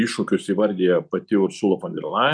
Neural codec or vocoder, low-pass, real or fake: none; 9.9 kHz; real